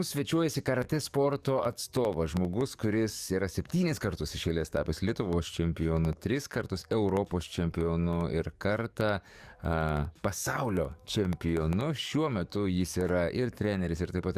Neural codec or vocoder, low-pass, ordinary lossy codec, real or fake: codec, 44.1 kHz, 7.8 kbps, DAC; 14.4 kHz; Opus, 64 kbps; fake